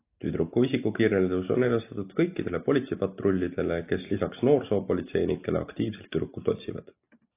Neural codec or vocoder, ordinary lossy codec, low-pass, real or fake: none; AAC, 24 kbps; 3.6 kHz; real